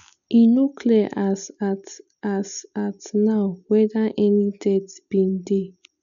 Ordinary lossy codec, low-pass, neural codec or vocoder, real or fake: none; 7.2 kHz; none; real